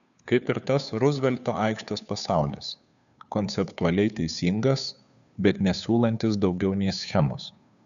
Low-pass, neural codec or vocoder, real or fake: 7.2 kHz; codec, 16 kHz, 2 kbps, FunCodec, trained on Chinese and English, 25 frames a second; fake